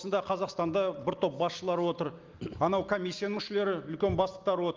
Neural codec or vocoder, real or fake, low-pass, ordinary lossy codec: none; real; 7.2 kHz; Opus, 32 kbps